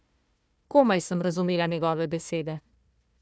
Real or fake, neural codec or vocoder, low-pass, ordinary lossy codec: fake; codec, 16 kHz, 1 kbps, FunCodec, trained on Chinese and English, 50 frames a second; none; none